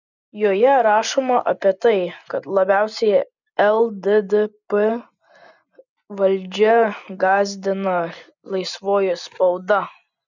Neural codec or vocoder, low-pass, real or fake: none; 7.2 kHz; real